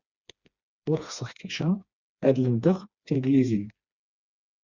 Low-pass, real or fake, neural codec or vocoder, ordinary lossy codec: 7.2 kHz; fake; codec, 16 kHz, 2 kbps, FreqCodec, smaller model; Opus, 64 kbps